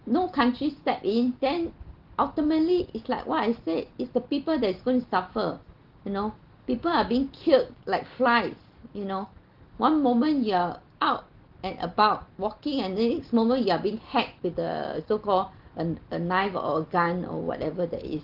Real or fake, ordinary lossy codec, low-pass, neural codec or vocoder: real; Opus, 16 kbps; 5.4 kHz; none